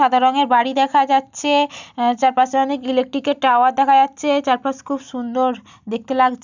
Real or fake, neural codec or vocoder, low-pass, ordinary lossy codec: real; none; 7.2 kHz; none